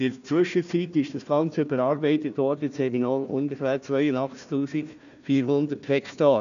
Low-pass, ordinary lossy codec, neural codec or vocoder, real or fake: 7.2 kHz; none; codec, 16 kHz, 1 kbps, FunCodec, trained on Chinese and English, 50 frames a second; fake